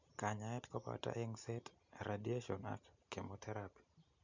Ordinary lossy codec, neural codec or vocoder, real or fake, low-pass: none; none; real; 7.2 kHz